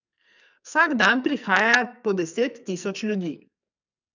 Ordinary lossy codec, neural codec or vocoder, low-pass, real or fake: none; codec, 44.1 kHz, 2.6 kbps, SNAC; 7.2 kHz; fake